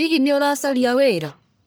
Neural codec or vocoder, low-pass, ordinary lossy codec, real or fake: codec, 44.1 kHz, 1.7 kbps, Pupu-Codec; none; none; fake